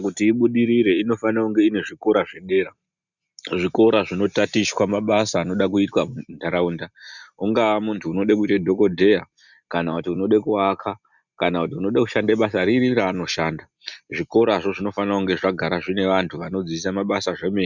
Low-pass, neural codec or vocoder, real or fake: 7.2 kHz; none; real